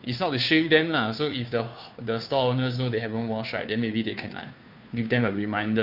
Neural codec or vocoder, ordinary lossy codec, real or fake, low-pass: codec, 16 kHz, 2 kbps, FunCodec, trained on Chinese and English, 25 frames a second; none; fake; 5.4 kHz